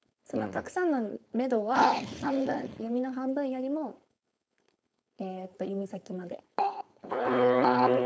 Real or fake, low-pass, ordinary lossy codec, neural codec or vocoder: fake; none; none; codec, 16 kHz, 4.8 kbps, FACodec